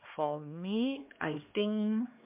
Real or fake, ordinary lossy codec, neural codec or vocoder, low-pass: fake; MP3, 32 kbps; codec, 16 kHz, 2 kbps, X-Codec, HuBERT features, trained on LibriSpeech; 3.6 kHz